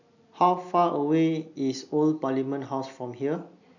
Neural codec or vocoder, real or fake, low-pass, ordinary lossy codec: none; real; 7.2 kHz; none